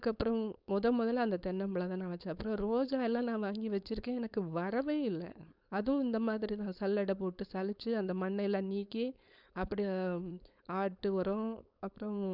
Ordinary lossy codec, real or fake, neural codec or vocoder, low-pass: none; fake; codec, 16 kHz, 4.8 kbps, FACodec; 5.4 kHz